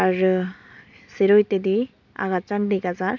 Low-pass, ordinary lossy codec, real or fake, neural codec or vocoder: 7.2 kHz; none; real; none